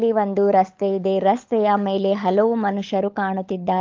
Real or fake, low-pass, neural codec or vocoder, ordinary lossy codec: fake; 7.2 kHz; codec, 16 kHz, 16 kbps, FunCodec, trained on LibriTTS, 50 frames a second; Opus, 24 kbps